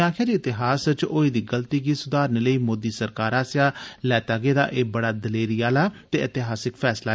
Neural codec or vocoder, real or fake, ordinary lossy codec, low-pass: none; real; none; none